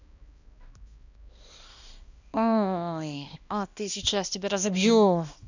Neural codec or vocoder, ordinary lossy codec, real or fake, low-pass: codec, 16 kHz, 1 kbps, X-Codec, HuBERT features, trained on balanced general audio; none; fake; 7.2 kHz